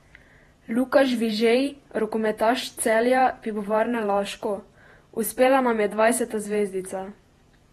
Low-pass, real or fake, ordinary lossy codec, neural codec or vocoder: 19.8 kHz; fake; AAC, 32 kbps; vocoder, 48 kHz, 128 mel bands, Vocos